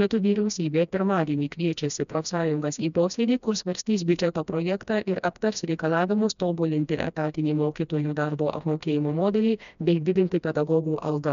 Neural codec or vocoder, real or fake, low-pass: codec, 16 kHz, 1 kbps, FreqCodec, smaller model; fake; 7.2 kHz